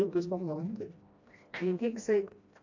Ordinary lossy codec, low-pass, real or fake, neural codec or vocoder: AAC, 48 kbps; 7.2 kHz; fake; codec, 16 kHz, 1 kbps, FreqCodec, smaller model